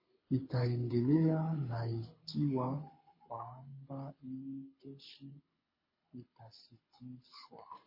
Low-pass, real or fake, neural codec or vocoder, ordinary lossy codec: 5.4 kHz; fake; codec, 24 kHz, 6 kbps, HILCodec; MP3, 24 kbps